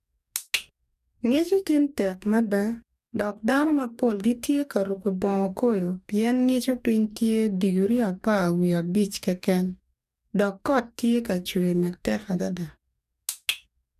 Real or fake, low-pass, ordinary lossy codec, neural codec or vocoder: fake; 14.4 kHz; none; codec, 44.1 kHz, 2.6 kbps, DAC